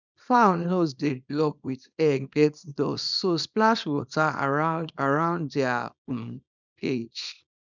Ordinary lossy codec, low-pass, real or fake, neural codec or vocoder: none; 7.2 kHz; fake; codec, 24 kHz, 0.9 kbps, WavTokenizer, small release